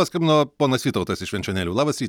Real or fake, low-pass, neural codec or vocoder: real; 19.8 kHz; none